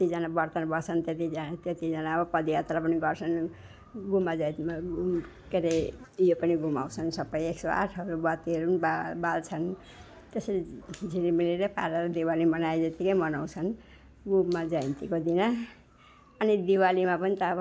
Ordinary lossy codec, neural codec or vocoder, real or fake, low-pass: none; none; real; none